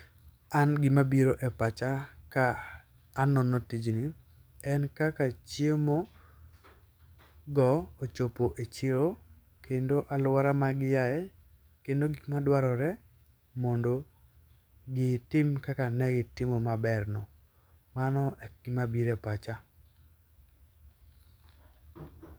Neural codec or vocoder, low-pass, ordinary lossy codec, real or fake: codec, 44.1 kHz, 7.8 kbps, DAC; none; none; fake